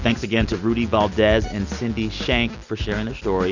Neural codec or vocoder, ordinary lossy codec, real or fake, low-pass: none; Opus, 64 kbps; real; 7.2 kHz